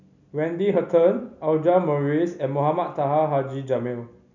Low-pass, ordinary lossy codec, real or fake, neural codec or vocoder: 7.2 kHz; none; real; none